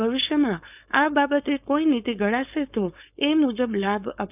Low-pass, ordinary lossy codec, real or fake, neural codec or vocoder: 3.6 kHz; none; fake; codec, 16 kHz, 4.8 kbps, FACodec